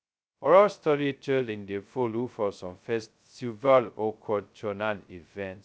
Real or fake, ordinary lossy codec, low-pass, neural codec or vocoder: fake; none; none; codec, 16 kHz, 0.2 kbps, FocalCodec